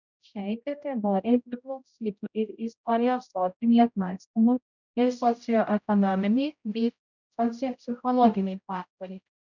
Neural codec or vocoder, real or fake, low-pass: codec, 16 kHz, 0.5 kbps, X-Codec, HuBERT features, trained on general audio; fake; 7.2 kHz